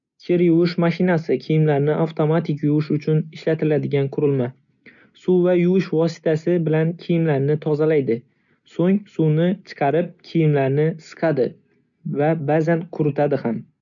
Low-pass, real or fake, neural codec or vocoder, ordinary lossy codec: 7.2 kHz; real; none; none